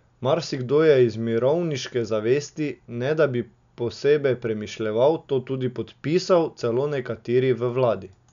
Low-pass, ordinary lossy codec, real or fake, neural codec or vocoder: 7.2 kHz; none; real; none